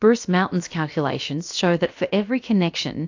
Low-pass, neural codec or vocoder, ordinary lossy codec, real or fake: 7.2 kHz; codec, 16 kHz, about 1 kbps, DyCAST, with the encoder's durations; AAC, 48 kbps; fake